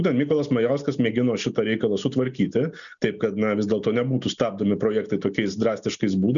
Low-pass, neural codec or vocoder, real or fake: 7.2 kHz; none; real